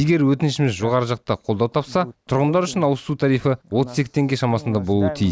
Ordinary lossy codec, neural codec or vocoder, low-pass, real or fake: none; none; none; real